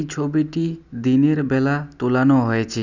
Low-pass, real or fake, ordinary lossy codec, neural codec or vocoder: 7.2 kHz; real; none; none